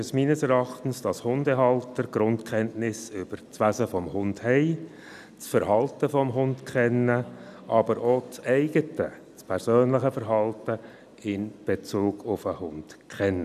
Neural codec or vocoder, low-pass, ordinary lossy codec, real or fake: none; 14.4 kHz; none; real